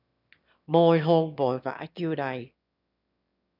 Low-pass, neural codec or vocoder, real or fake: 5.4 kHz; autoencoder, 22.05 kHz, a latent of 192 numbers a frame, VITS, trained on one speaker; fake